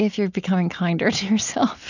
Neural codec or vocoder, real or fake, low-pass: none; real; 7.2 kHz